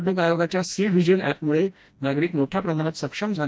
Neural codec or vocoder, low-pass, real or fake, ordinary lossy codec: codec, 16 kHz, 1 kbps, FreqCodec, smaller model; none; fake; none